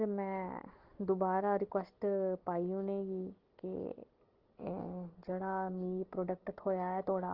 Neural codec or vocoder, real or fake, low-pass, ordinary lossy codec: none; real; 5.4 kHz; Opus, 16 kbps